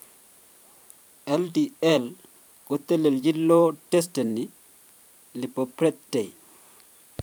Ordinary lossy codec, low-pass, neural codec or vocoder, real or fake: none; none; vocoder, 44.1 kHz, 128 mel bands every 512 samples, BigVGAN v2; fake